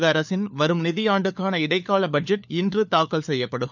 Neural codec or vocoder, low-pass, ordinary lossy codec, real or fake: codec, 16 kHz, 4 kbps, FunCodec, trained on LibriTTS, 50 frames a second; 7.2 kHz; none; fake